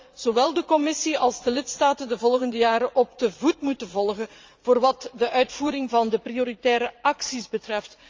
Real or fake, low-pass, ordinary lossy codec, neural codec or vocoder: fake; 7.2 kHz; Opus, 32 kbps; vocoder, 44.1 kHz, 80 mel bands, Vocos